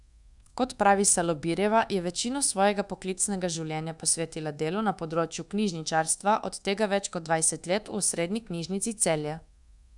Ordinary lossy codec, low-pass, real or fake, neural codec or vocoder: none; 10.8 kHz; fake; codec, 24 kHz, 1.2 kbps, DualCodec